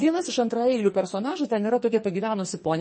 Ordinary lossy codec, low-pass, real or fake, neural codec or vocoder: MP3, 32 kbps; 10.8 kHz; fake; codec, 44.1 kHz, 2.6 kbps, SNAC